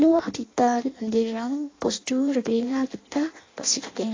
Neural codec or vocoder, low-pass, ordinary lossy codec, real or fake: codec, 16 kHz in and 24 kHz out, 0.6 kbps, FireRedTTS-2 codec; 7.2 kHz; AAC, 32 kbps; fake